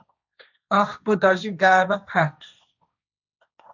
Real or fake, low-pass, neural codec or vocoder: fake; 7.2 kHz; codec, 16 kHz, 1.1 kbps, Voila-Tokenizer